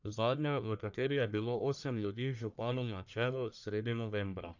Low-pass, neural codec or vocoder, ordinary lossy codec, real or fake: 7.2 kHz; codec, 44.1 kHz, 1.7 kbps, Pupu-Codec; none; fake